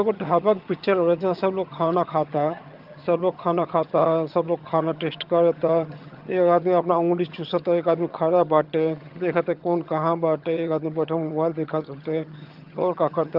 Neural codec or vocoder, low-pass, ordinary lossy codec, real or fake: vocoder, 22.05 kHz, 80 mel bands, HiFi-GAN; 5.4 kHz; Opus, 24 kbps; fake